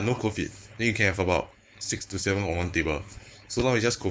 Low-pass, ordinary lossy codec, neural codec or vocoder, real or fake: none; none; codec, 16 kHz, 4.8 kbps, FACodec; fake